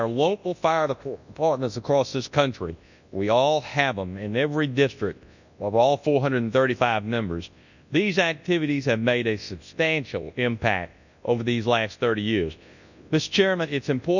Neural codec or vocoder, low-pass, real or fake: codec, 24 kHz, 0.9 kbps, WavTokenizer, large speech release; 7.2 kHz; fake